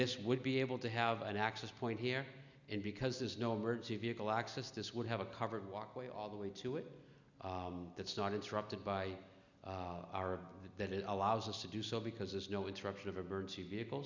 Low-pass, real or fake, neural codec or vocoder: 7.2 kHz; real; none